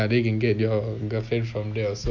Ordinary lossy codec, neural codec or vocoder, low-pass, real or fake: none; none; 7.2 kHz; real